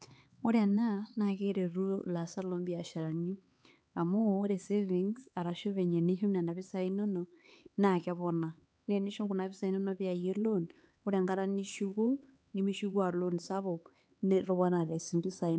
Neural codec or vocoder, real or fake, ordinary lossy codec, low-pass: codec, 16 kHz, 4 kbps, X-Codec, HuBERT features, trained on LibriSpeech; fake; none; none